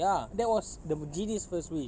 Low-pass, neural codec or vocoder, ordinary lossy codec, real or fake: none; none; none; real